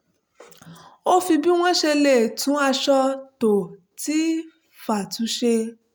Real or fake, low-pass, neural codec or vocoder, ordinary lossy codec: real; none; none; none